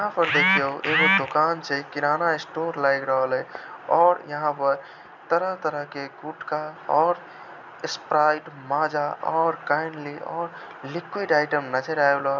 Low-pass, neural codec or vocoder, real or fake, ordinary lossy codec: 7.2 kHz; none; real; none